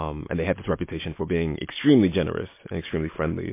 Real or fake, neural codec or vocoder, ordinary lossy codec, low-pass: real; none; MP3, 24 kbps; 3.6 kHz